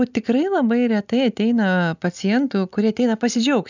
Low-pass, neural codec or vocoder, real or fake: 7.2 kHz; none; real